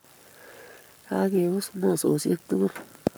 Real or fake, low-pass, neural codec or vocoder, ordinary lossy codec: fake; none; codec, 44.1 kHz, 7.8 kbps, Pupu-Codec; none